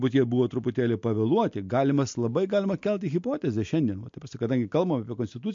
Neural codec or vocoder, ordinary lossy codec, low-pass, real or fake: none; MP3, 48 kbps; 7.2 kHz; real